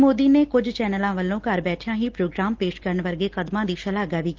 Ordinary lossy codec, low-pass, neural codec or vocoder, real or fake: Opus, 16 kbps; 7.2 kHz; none; real